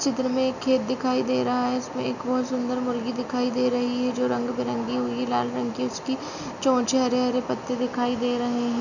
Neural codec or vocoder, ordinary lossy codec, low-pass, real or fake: none; none; 7.2 kHz; real